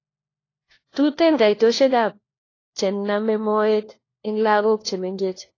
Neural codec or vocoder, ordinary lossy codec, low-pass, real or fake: codec, 16 kHz, 1 kbps, FunCodec, trained on LibriTTS, 50 frames a second; AAC, 32 kbps; 7.2 kHz; fake